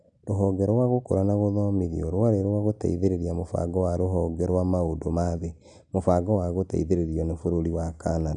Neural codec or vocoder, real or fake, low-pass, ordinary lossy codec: none; real; 9.9 kHz; none